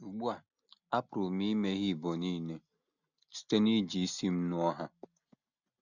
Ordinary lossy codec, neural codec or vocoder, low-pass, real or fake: none; none; 7.2 kHz; real